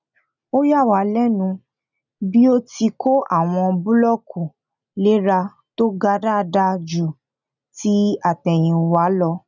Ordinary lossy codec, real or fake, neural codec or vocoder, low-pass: none; real; none; 7.2 kHz